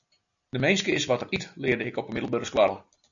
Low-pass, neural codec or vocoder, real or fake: 7.2 kHz; none; real